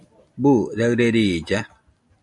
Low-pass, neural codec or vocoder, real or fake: 10.8 kHz; none; real